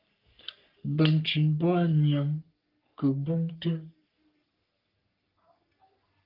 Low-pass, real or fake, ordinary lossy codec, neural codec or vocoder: 5.4 kHz; fake; Opus, 32 kbps; codec, 44.1 kHz, 3.4 kbps, Pupu-Codec